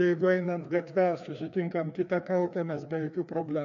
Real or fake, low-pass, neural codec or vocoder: fake; 7.2 kHz; codec, 16 kHz, 2 kbps, FreqCodec, larger model